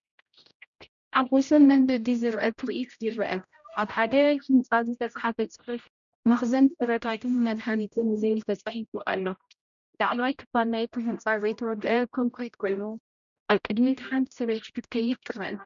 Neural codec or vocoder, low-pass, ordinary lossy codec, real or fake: codec, 16 kHz, 0.5 kbps, X-Codec, HuBERT features, trained on general audio; 7.2 kHz; MP3, 96 kbps; fake